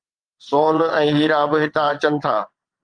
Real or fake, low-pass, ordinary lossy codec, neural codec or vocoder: fake; 9.9 kHz; Opus, 32 kbps; vocoder, 22.05 kHz, 80 mel bands, WaveNeXt